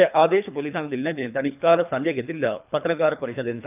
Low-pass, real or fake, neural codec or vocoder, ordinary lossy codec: 3.6 kHz; fake; codec, 24 kHz, 3 kbps, HILCodec; none